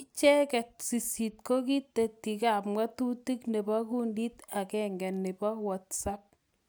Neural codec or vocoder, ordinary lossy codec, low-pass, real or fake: none; none; none; real